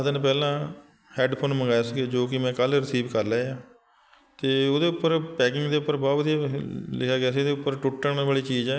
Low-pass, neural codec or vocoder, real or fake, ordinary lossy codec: none; none; real; none